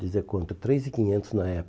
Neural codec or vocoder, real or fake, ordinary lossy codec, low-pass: none; real; none; none